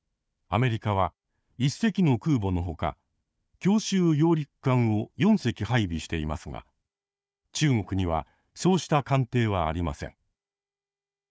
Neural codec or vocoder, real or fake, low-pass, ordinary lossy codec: codec, 16 kHz, 4 kbps, FunCodec, trained on Chinese and English, 50 frames a second; fake; none; none